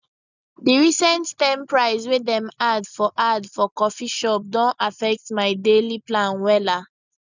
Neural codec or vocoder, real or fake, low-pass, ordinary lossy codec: none; real; 7.2 kHz; none